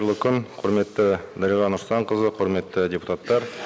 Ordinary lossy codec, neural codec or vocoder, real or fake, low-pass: none; none; real; none